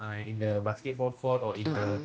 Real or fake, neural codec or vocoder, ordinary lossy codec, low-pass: fake; codec, 16 kHz, 1 kbps, X-Codec, HuBERT features, trained on general audio; none; none